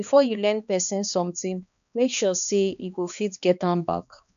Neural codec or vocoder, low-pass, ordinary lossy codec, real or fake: codec, 16 kHz, 2 kbps, X-Codec, HuBERT features, trained on balanced general audio; 7.2 kHz; none; fake